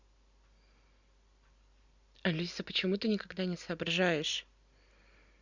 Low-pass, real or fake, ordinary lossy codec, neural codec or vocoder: 7.2 kHz; real; Opus, 64 kbps; none